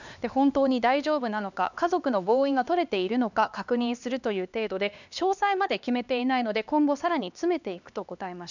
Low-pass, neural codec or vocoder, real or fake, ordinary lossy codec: 7.2 kHz; codec, 16 kHz, 2 kbps, X-Codec, HuBERT features, trained on LibriSpeech; fake; none